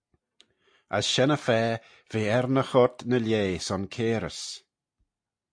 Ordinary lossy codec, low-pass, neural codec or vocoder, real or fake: AAC, 64 kbps; 9.9 kHz; none; real